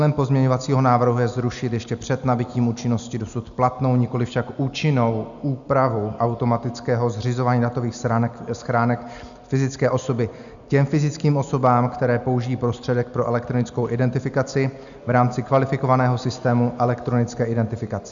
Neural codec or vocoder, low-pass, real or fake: none; 7.2 kHz; real